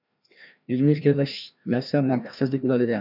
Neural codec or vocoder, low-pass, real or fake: codec, 16 kHz, 1 kbps, FreqCodec, larger model; 5.4 kHz; fake